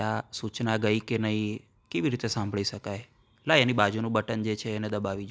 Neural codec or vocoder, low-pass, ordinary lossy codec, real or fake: none; none; none; real